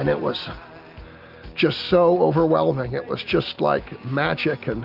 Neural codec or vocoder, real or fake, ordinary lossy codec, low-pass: none; real; Opus, 24 kbps; 5.4 kHz